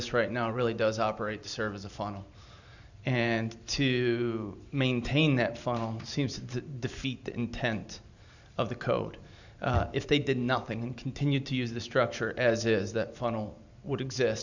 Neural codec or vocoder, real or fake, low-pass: vocoder, 44.1 kHz, 128 mel bands every 512 samples, BigVGAN v2; fake; 7.2 kHz